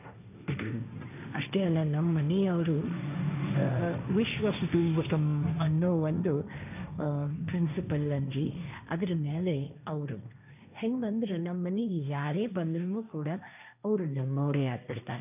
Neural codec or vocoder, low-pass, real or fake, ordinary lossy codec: codec, 16 kHz, 1.1 kbps, Voila-Tokenizer; 3.6 kHz; fake; none